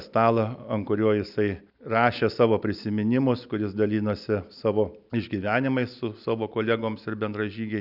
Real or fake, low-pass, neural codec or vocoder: real; 5.4 kHz; none